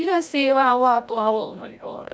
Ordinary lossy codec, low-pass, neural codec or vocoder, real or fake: none; none; codec, 16 kHz, 0.5 kbps, FreqCodec, larger model; fake